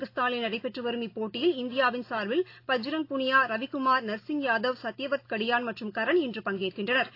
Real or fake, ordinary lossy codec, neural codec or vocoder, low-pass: real; AAC, 32 kbps; none; 5.4 kHz